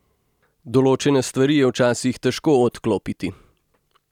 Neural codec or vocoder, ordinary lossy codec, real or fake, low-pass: none; none; real; 19.8 kHz